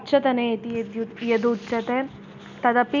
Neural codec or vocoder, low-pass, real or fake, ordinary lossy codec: none; 7.2 kHz; real; none